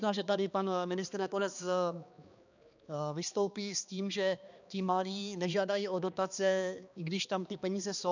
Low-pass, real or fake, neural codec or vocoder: 7.2 kHz; fake; codec, 16 kHz, 2 kbps, X-Codec, HuBERT features, trained on balanced general audio